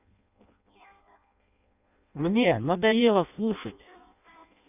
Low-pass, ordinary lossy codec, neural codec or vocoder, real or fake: 3.6 kHz; none; codec, 16 kHz in and 24 kHz out, 0.6 kbps, FireRedTTS-2 codec; fake